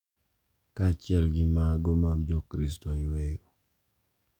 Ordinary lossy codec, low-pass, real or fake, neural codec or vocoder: none; 19.8 kHz; fake; codec, 44.1 kHz, 7.8 kbps, DAC